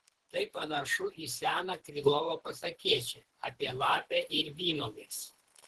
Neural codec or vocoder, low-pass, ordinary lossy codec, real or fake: codec, 24 kHz, 3 kbps, HILCodec; 10.8 kHz; Opus, 16 kbps; fake